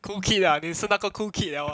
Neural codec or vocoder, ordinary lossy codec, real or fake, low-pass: none; none; real; none